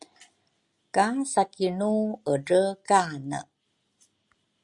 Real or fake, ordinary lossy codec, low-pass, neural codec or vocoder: real; Opus, 64 kbps; 10.8 kHz; none